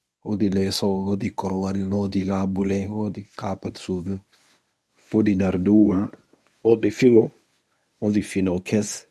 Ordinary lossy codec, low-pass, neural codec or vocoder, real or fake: none; none; codec, 24 kHz, 0.9 kbps, WavTokenizer, medium speech release version 1; fake